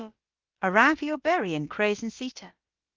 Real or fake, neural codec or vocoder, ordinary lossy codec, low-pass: fake; codec, 16 kHz, about 1 kbps, DyCAST, with the encoder's durations; Opus, 32 kbps; 7.2 kHz